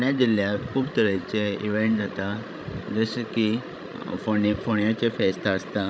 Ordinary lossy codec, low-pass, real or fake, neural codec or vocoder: none; none; fake; codec, 16 kHz, 16 kbps, FreqCodec, larger model